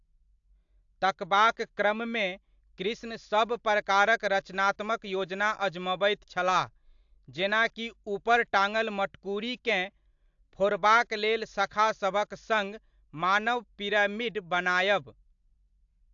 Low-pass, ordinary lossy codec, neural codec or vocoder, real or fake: 7.2 kHz; AAC, 64 kbps; none; real